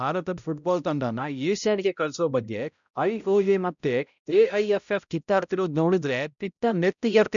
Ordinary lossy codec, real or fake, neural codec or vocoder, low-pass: none; fake; codec, 16 kHz, 0.5 kbps, X-Codec, HuBERT features, trained on balanced general audio; 7.2 kHz